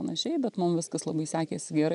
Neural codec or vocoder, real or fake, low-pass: none; real; 10.8 kHz